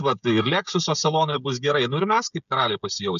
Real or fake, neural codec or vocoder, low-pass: fake; codec, 16 kHz, 16 kbps, FreqCodec, smaller model; 7.2 kHz